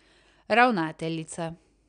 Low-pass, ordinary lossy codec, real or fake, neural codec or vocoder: 9.9 kHz; none; real; none